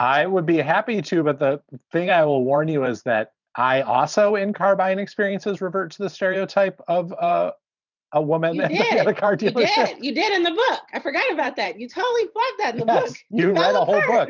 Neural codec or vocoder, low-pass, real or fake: vocoder, 44.1 kHz, 128 mel bands every 512 samples, BigVGAN v2; 7.2 kHz; fake